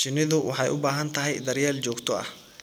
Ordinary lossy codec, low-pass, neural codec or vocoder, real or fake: none; none; none; real